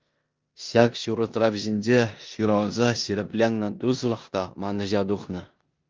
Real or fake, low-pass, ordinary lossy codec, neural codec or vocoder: fake; 7.2 kHz; Opus, 32 kbps; codec, 16 kHz in and 24 kHz out, 0.9 kbps, LongCat-Audio-Codec, four codebook decoder